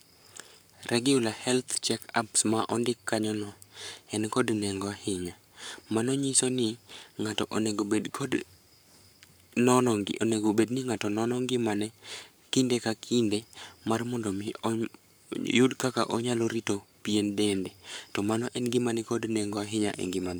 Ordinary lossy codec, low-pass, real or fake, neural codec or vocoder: none; none; fake; codec, 44.1 kHz, 7.8 kbps, Pupu-Codec